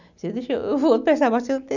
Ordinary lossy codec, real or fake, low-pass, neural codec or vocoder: none; real; 7.2 kHz; none